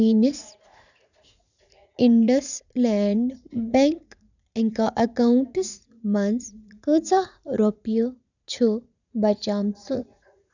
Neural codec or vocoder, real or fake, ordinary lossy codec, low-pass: vocoder, 44.1 kHz, 80 mel bands, Vocos; fake; none; 7.2 kHz